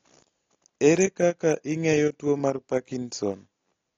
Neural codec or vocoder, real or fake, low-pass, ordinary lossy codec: none; real; 7.2 kHz; AAC, 32 kbps